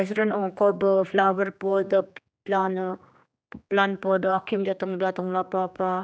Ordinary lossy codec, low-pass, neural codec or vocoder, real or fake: none; none; codec, 16 kHz, 2 kbps, X-Codec, HuBERT features, trained on general audio; fake